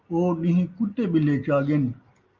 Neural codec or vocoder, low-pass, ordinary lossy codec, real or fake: none; 7.2 kHz; Opus, 24 kbps; real